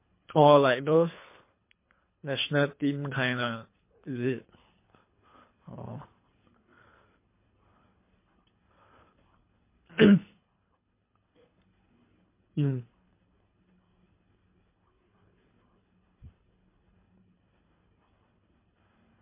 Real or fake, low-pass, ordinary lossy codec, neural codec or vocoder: fake; 3.6 kHz; MP3, 24 kbps; codec, 24 kHz, 3 kbps, HILCodec